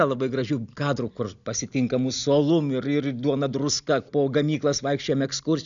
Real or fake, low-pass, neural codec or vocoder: real; 7.2 kHz; none